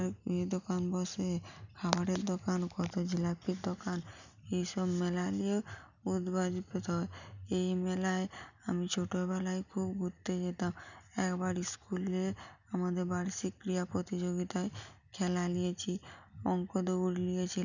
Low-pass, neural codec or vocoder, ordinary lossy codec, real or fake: 7.2 kHz; none; none; real